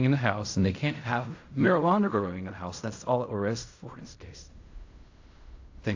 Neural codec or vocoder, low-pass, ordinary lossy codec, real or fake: codec, 16 kHz in and 24 kHz out, 0.4 kbps, LongCat-Audio-Codec, fine tuned four codebook decoder; 7.2 kHz; AAC, 48 kbps; fake